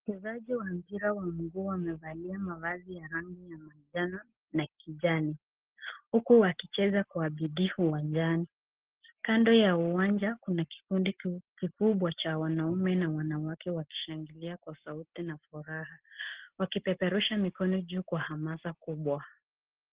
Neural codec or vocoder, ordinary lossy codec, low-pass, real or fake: none; Opus, 16 kbps; 3.6 kHz; real